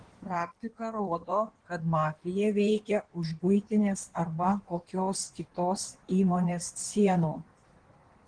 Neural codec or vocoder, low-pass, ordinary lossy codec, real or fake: codec, 16 kHz in and 24 kHz out, 1.1 kbps, FireRedTTS-2 codec; 9.9 kHz; Opus, 16 kbps; fake